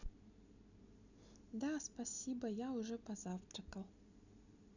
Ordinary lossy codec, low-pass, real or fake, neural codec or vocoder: none; 7.2 kHz; real; none